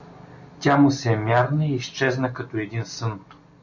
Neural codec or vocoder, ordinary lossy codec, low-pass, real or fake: none; AAC, 48 kbps; 7.2 kHz; real